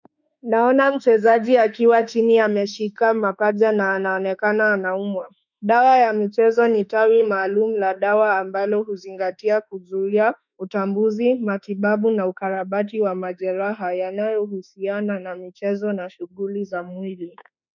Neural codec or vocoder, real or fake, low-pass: autoencoder, 48 kHz, 32 numbers a frame, DAC-VAE, trained on Japanese speech; fake; 7.2 kHz